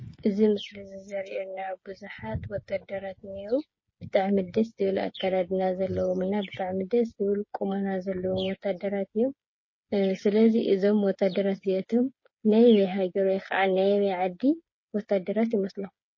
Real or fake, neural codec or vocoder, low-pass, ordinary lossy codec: fake; codec, 16 kHz, 8 kbps, FreqCodec, smaller model; 7.2 kHz; MP3, 32 kbps